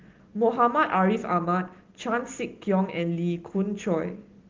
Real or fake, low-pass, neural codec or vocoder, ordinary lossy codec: real; 7.2 kHz; none; Opus, 16 kbps